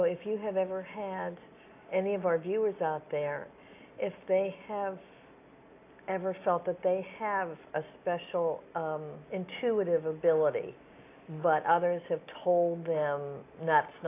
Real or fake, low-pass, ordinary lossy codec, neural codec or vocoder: real; 3.6 kHz; AAC, 24 kbps; none